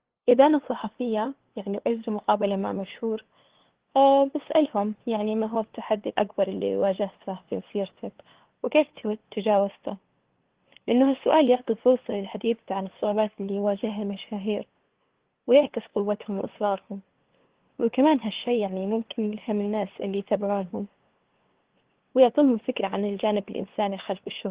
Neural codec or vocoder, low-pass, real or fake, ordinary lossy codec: codec, 16 kHz, 2 kbps, FunCodec, trained on LibriTTS, 25 frames a second; 3.6 kHz; fake; Opus, 16 kbps